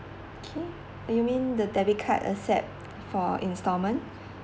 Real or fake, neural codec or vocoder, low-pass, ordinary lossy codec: real; none; none; none